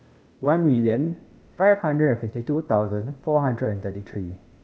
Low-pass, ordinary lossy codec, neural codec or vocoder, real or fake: none; none; codec, 16 kHz, 0.8 kbps, ZipCodec; fake